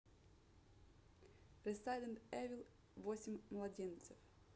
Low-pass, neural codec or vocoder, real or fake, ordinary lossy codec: none; none; real; none